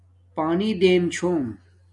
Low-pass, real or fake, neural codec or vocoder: 10.8 kHz; real; none